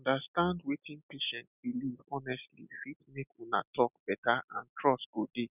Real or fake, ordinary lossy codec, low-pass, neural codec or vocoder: real; none; 3.6 kHz; none